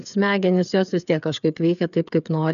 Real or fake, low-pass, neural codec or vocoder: fake; 7.2 kHz; codec, 16 kHz, 8 kbps, FreqCodec, smaller model